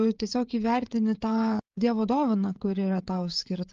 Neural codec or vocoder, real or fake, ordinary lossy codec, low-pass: codec, 16 kHz, 16 kbps, FreqCodec, smaller model; fake; Opus, 32 kbps; 7.2 kHz